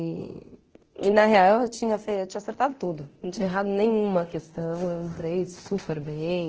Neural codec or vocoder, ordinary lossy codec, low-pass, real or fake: codec, 24 kHz, 0.9 kbps, DualCodec; Opus, 16 kbps; 7.2 kHz; fake